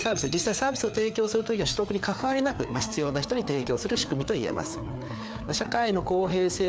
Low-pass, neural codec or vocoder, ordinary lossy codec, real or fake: none; codec, 16 kHz, 4 kbps, FreqCodec, larger model; none; fake